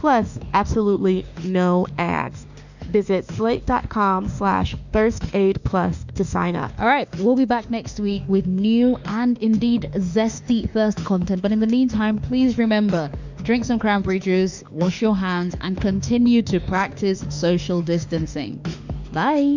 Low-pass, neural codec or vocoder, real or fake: 7.2 kHz; autoencoder, 48 kHz, 32 numbers a frame, DAC-VAE, trained on Japanese speech; fake